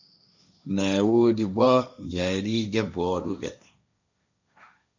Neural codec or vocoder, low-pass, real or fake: codec, 16 kHz, 1.1 kbps, Voila-Tokenizer; 7.2 kHz; fake